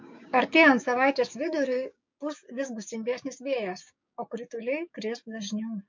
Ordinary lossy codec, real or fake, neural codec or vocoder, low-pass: MP3, 48 kbps; fake; codec, 16 kHz, 6 kbps, DAC; 7.2 kHz